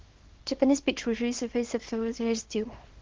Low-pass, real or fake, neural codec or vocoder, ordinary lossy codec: 7.2 kHz; fake; codec, 24 kHz, 0.9 kbps, WavTokenizer, small release; Opus, 16 kbps